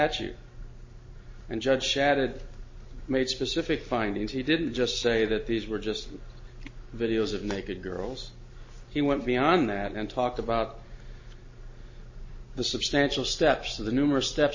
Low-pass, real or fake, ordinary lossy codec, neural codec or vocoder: 7.2 kHz; real; MP3, 32 kbps; none